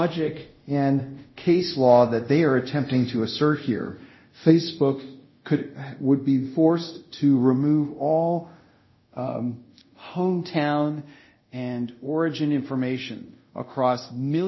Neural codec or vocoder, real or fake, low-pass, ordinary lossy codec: codec, 24 kHz, 0.5 kbps, DualCodec; fake; 7.2 kHz; MP3, 24 kbps